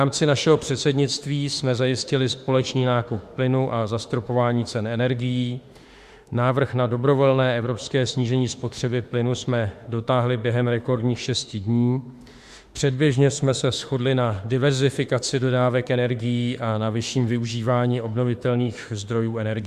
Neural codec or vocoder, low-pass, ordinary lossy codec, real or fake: autoencoder, 48 kHz, 32 numbers a frame, DAC-VAE, trained on Japanese speech; 14.4 kHz; Opus, 64 kbps; fake